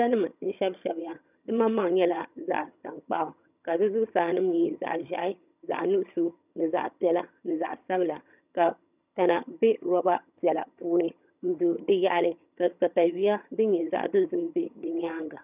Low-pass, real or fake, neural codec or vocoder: 3.6 kHz; fake; vocoder, 22.05 kHz, 80 mel bands, HiFi-GAN